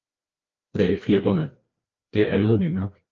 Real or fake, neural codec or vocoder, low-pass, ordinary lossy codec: fake; codec, 16 kHz, 2 kbps, FreqCodec, larger model; 7.2 kHz; Opus, 24 kbps